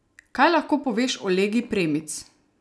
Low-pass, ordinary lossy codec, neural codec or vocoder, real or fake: none; none; none; real